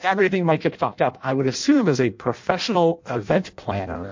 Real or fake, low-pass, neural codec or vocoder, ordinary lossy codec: fake; 7.2 kHz; codec, 16 kHz in and 24 kHz out, 0.6 kbps, FireRedTTS-2 codec; MP3, 48 kbps